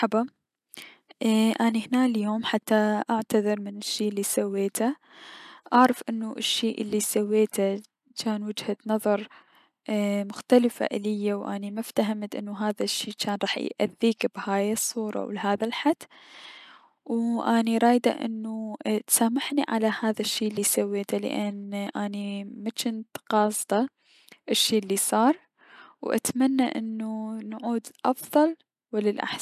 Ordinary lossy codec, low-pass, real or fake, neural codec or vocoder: none; 14.4 kHz; real; none